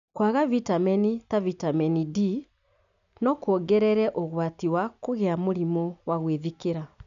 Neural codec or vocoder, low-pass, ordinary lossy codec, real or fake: none; 7.2 kHz; none; real